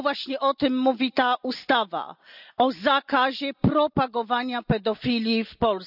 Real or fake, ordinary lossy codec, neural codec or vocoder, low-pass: real; none; none; 5.4 kHz